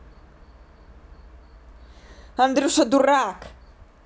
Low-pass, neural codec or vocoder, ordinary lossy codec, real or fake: none; none; none; real